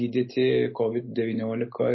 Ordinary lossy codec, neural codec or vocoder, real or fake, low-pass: MP3, 24 kbps; none; real; 7.2 kHz